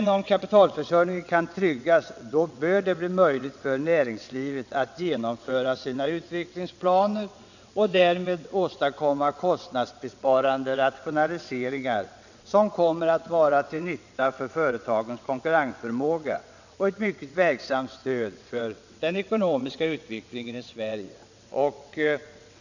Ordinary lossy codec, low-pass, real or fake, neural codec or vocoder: none; 7.2 kHz; fake; vocoder, 22.05 kHz, 80 mel bands, WaveNeXt